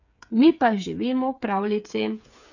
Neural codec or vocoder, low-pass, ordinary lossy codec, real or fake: codec, 16 kHz, 8 kbps, FreqCodec, smaller model; 7.2 kHz; none; fake